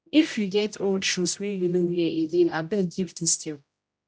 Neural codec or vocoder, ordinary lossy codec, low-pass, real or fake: codec, 16 kHz, 0.5 kbps, X-Codec, HuBERT features, trained on general audio; none; none; fake